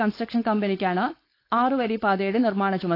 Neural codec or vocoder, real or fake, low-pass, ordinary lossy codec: codec, 16 kHz, 4.8 kbps, FACodec; fake; 5.4 kHz; AAC, 32 kbps